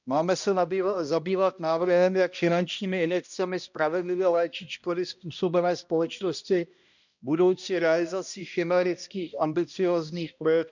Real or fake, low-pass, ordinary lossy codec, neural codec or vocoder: fake; 7.2 kHz; none; codec, 16 kHz, 1 kbps, X-Codec, HuBERT features, trained on balanced general audio